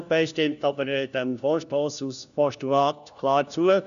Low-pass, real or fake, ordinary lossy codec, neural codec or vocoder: 7.2 kHz; fake; none; codec, 16 kHz, 1 kbps, FunCodec, trained on LibriTTS, 50 frames a second